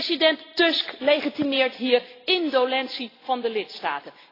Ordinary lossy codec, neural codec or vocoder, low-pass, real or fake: AAC, 24 kbps; none; 5.4 kHz; real